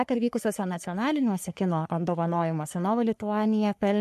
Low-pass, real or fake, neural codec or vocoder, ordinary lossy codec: 14.4 kHz; fake; codec, 44.1 kHz, 3.4 kbps, Pupu-Codec; MP3, 64 kbps